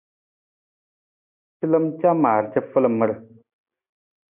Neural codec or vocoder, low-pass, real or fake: none; 3.6 kHz; real